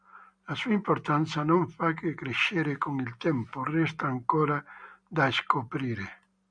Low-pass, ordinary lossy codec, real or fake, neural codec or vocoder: 9.9 kHz; MP3, 96 kbps; real; none